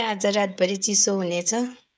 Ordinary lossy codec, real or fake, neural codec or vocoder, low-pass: none; fake; codec, 16 kHz, 16 kbps, FreqCodec, smaller model; none